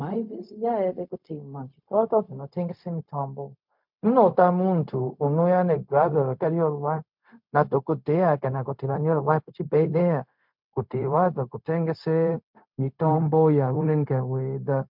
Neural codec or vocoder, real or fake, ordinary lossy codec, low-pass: codec, 16 kHz, 0.4 kbps, LongCat-Audio-Codec; fake; MP3, 48 kbps; 5.4 kHz